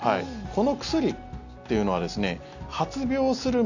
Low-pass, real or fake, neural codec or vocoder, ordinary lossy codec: 7.2 kHz; real; none; none